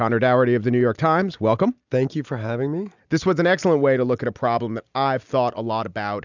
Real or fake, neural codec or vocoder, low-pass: real; none; 7.2 kHz